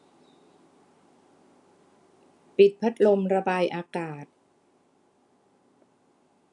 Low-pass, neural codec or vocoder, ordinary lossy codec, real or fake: 10.8 kHz; none; none; real